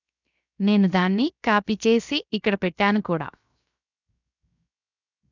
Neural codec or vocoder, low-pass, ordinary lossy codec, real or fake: codec, 16 kHz, 0.7 kbps, FocalCodec; 7.2 kHz; none; fake